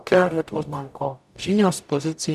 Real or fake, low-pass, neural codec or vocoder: fake; 14.4 kHz; codec, 44.1 kHz, 0.9 kbps, DAC